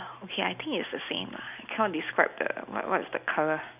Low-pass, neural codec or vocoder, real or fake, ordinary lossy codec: 3.6 kHz; none; real; none